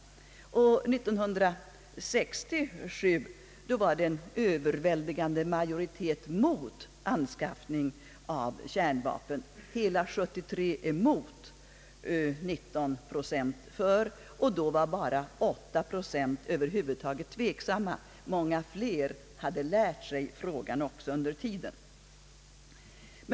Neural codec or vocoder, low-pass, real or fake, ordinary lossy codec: none; none; real; none